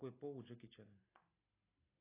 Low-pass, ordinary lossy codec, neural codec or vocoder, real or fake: 3.6 kHz; AAC, 16 kbps; none; real